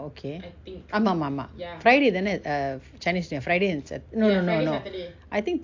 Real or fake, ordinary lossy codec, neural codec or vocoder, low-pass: real; AAC, 48 kbps; none; 7.2 kHz